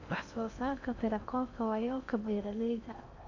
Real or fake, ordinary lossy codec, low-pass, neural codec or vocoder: fake; none; 7.2 kHz; codec, 16 kHz in and 24 kHz out, 0.8 kbps, FocalCodec, streaming, 65536 codes